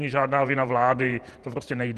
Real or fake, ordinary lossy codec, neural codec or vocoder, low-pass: fake; Opus, 24 kbps; vocoder, 48 kHz, 128 mel bands, Vocos; 14.4 kHz